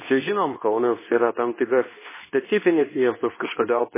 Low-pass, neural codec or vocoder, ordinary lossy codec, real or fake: 3.6 kHz; codec, 24 kHz, 0.9 kbps, WavTokenizer, medium speech release version 2; MP3, 16 kbps; fake